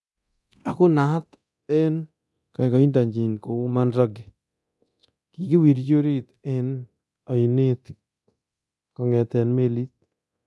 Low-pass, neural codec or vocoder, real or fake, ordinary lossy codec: none; codec, 24 kHz, 0.9 kbps, DualCodec; fake; none